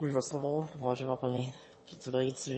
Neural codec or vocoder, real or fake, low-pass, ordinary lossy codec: autoencoder, 22.05 kHz, a latent of 192 numbers a frame, VITS, trained on one speaker; fake; 9.9 kHz; MP3, 32 kbps